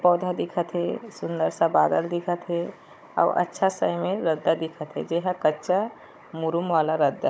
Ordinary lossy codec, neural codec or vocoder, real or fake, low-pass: none; codec, 16 kHz, 16 kbps, FunCodec, trained on Chinese and English, 50 frames a second; fake; none